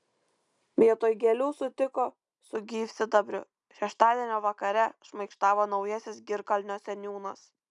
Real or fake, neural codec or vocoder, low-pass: real; none; 10.8 kHz